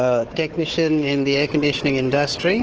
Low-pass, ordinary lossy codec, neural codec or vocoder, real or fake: 7.2 kHz; Opus, 16 kbps; codec, 16 kHz, 4 kbps, FunCodec, trained on Chinese and English, 50 frames a second; fake